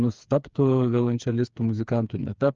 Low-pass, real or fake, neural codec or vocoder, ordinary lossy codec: 7.2 kHz; fake; codec, 16 kHz, 4 kbps, FreqCodec, smaller model; Opus, 24 kbps